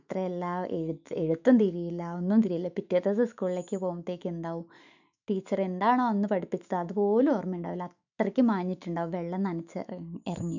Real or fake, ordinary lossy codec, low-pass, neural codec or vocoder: fake; none; 7.2 kHz; autoencoder, 48 kHz, 128 numbers a frame, DAC-VAE, trained on Japanese speech